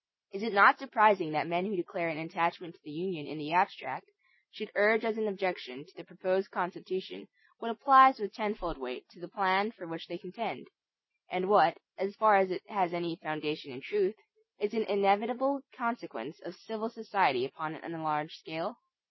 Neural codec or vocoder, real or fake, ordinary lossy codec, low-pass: none; real; MP3, 24 kbps; 7.2 kHz